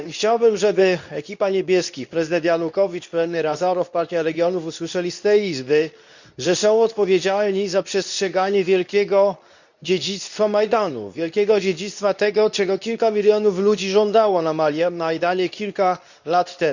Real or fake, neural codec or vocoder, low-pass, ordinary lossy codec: fake; codec, 24 kHz, 0.9 kbps, WavTokenizer, medium speech release version 2; 7.2 kHz; none